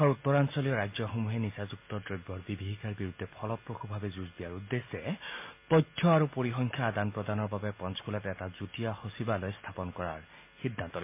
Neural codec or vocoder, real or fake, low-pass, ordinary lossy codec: none; real; 3.6 kHz; MP3, 24 kbps